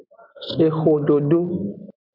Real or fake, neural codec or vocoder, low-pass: real; none; 5.4 kHz